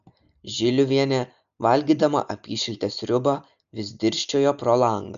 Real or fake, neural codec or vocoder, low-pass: real; none; 7.2 kHz